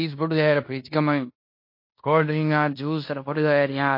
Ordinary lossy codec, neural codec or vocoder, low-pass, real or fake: MP3, 32 kbps; codec, 16 kHz in and 24 kHz out, 0.9 kbps, LongCat-Audio-Codec, fine tuned four codebook decoder; 5.4 kHz; fake